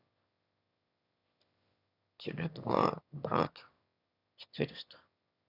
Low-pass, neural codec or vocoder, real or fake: 5.4 kHz; autoencoder, 22.05 kHz, a latent of 192 numbers a frame, VITS, trained on one speaker; fake